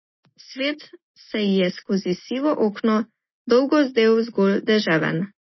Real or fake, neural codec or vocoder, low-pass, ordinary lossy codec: real; none; 7.2 kHz; MP3, 24 kbps